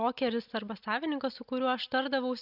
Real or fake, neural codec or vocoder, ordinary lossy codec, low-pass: real; none; Opus, 64 kbps; 5.4 kHz